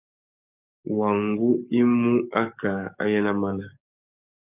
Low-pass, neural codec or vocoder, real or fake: 3.6 kHz; codec, 44.1 kHz, 7.8 kbps, DAC; fake